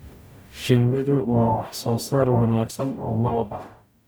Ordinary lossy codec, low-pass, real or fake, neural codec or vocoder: none; none; fake; codec, 44.1 kHz, 0.9 kbps, DAC